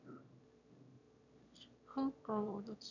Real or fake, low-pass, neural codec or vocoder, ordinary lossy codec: fake; 7.2 kHz; autoencoder, 22.05 kHz, a latent of 192 numbers a frame, VITS, trained on one speaker; none